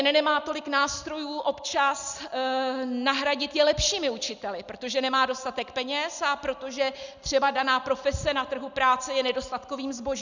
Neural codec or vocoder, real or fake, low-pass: none; real; 7.2 kHz